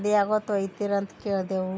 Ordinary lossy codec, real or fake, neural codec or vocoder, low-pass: none; real; none; none